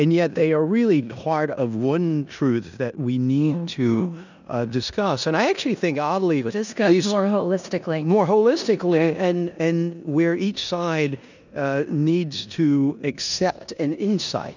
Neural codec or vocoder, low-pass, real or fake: codec, 16 kHz in and 24 kHz out, 0.9 kbps, LongCat-Audio-Codec, four codebook decoder; 7.2 kHz; fake